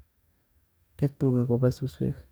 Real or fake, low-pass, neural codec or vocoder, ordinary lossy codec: fake; none; codec, 44.1 kHz, 2.6 kbps, DAC; none